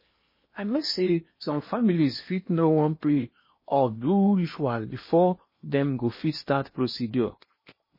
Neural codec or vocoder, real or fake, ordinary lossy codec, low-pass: codec, 16 kHz in and 24 kHz out, 0.8 kbps, FocalCodec, streaming, 65536 codes; fake; MP3, 24 kbps; 5.4 kHz